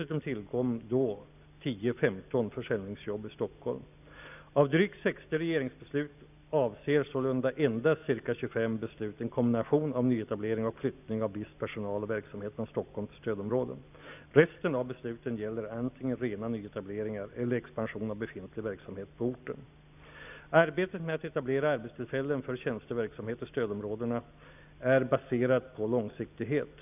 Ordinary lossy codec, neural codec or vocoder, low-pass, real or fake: none; none; 3.6 kHz; real